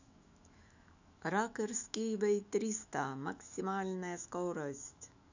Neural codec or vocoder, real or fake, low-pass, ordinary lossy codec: autoencoder, 48 kHz, 128 numbers a frame, DAC-VAE, trained on Japanese speech; fake; 7.2 kHz; none